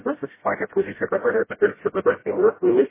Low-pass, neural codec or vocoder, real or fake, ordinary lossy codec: 3.6 kHz; codec, 16 kHz, 0.5 kbps, FreqCodec, smaller model; fake; MP3, 16 kbps